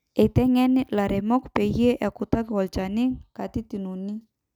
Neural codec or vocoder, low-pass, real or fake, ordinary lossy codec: none; 19.8 kHz; real; none